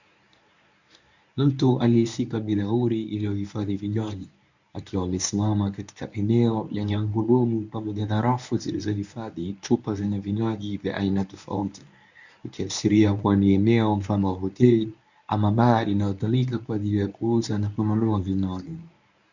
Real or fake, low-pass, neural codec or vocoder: fake; 7.2 kHz; codec, 24 kHz, 0.9 kbps, WavTokenizer, medium speech release version 1